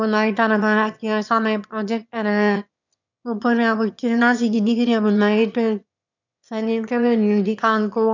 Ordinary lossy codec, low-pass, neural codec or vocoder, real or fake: none; 7.2 kHz; autoencoder, 22.05 kHz, a latent of 192 numbers a frame, VITS, trained on one speaker; fake